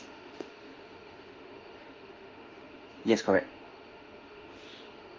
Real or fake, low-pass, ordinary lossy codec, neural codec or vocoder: fake; 7.2 kHz; Opus, 24 kbps; autoencoder, 48 kHz, 32 numbers a frame, DAC-VAE, trained on Japanese speech